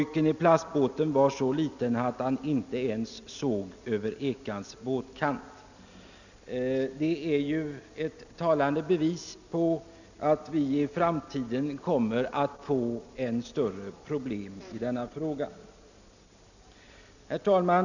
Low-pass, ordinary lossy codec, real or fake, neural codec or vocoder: 7.2 kHz; none; real; none